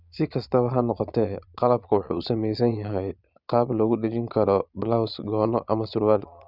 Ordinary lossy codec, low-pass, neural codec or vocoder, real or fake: Opus, 64 kbps; 5.4 kHz; vocoder, 22.05 kHz, 80 mel bands, Vocos; fake